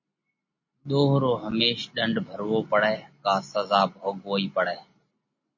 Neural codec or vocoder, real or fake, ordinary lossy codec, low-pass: none; real; MP3, 32 kbps; 7.2 kHz